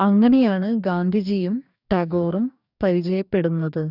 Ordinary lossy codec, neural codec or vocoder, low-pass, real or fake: none; codec, 44.1 kHz, 2.6 kbps, DAC; 5.4 kHz; fake